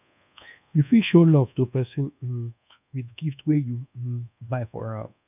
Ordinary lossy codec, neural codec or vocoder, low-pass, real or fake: none; codec, 24 kHz, 1.2 kbps, DualCodec; 3.6 kHz; fake